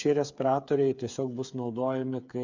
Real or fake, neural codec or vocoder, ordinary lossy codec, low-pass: fake; codec, 16 kHz, 8 kbps, FreqCodec, smaller model; MP3, 64 kbps; 7.2 kHz